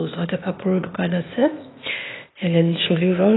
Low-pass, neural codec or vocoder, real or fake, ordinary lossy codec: 7.2 kHz; codec, 16 kHz, 0.8 kbps, ZipCodec; fake; AAC, 16 kbps